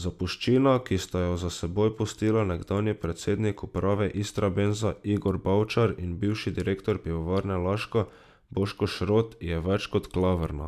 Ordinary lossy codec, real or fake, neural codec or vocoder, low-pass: none; real; none; 14.4 kHz